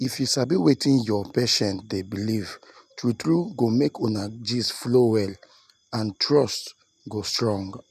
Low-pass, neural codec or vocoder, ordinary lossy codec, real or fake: 14.4 kHz; none; none; real